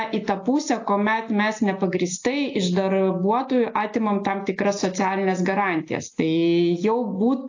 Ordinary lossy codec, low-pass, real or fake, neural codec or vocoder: AAC, 48 kbps; 7.2 kHz; real; none